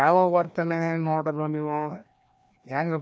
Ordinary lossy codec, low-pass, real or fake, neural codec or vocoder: none; none; fake; codec, 16 kHz, 1 kbps, FreqCodec, larger model